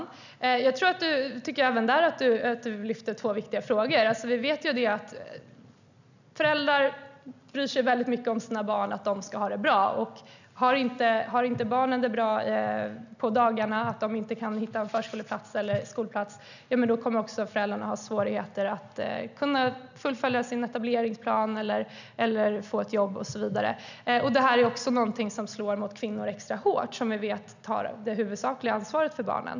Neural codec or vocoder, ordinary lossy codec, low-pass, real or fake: none; none; 7.2 kHz; real